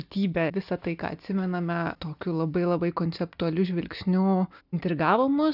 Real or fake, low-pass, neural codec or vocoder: real; 5.4 kHz; none